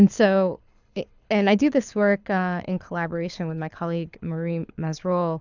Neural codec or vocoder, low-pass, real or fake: codec, 24 kHz, 6 kbps, HILCodec; 7.2 kHz; fake